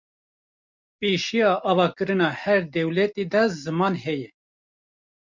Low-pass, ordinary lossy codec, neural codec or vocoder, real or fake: 7.2 kHz; MP3, 64 kbps; none; real